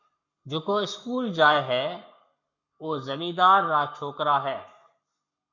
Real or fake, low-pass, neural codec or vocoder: fake; 7.2 kHz; codec, 44.1 kHz, 7.8 kbps, Pupu-Codec